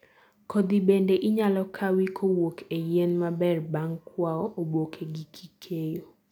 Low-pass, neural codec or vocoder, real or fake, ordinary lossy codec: 19.8 kHz; none; real; none